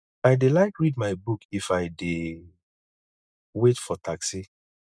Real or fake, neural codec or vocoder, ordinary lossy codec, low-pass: real; none; none; none